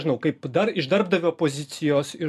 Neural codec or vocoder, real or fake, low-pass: none; real; 14.4 kHz